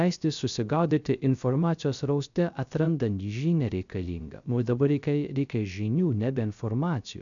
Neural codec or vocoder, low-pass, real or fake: codec, 16 kHz, 0.3 kbps, FocalCodec; 7.2 kHz; fake